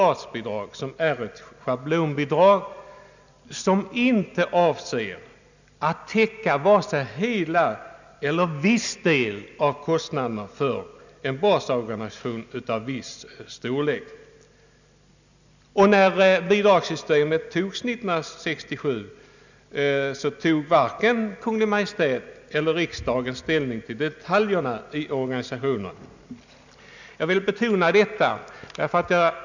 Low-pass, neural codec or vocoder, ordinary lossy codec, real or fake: 7.2 kHz; none; none; real